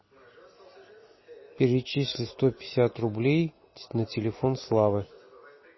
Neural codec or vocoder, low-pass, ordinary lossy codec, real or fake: none; 7.2 kHz; MP3, 24 kbps; real